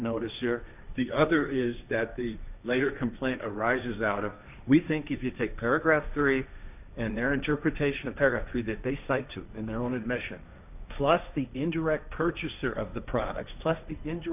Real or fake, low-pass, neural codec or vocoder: fake; 3.6 kHz; codec, 16 kHz, 1.1 kbps, Voila-Tokenizer